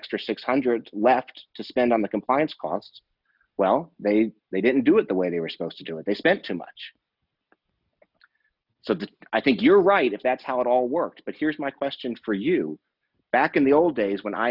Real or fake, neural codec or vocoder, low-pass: real; none; 5.4 kHz